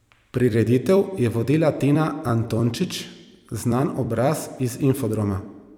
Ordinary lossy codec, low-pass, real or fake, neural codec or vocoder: none; 19.8 kHz; fake; vocoder, 44.1 kHz, 128 mel bands every 256 samples, BigVGAN v2